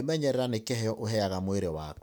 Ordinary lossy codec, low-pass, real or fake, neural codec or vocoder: none; none; real; none